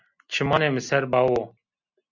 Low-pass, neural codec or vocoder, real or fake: 7.2 kHz; none; real